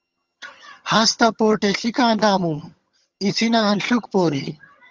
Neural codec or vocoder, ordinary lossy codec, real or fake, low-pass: vocoder, 22.05 kHz, 80 mel bands, HiFi-GAN; Opus, 32 kbps; fake; 7.2 kHz